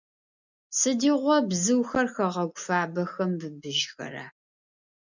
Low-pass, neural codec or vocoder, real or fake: 7.2 kHz; none; real